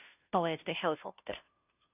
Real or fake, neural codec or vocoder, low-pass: fake; codec, 16 kHz, 0.5 kbps, FunCodec, trained on Chinese and English, 25 frames a second; 3.6 kHz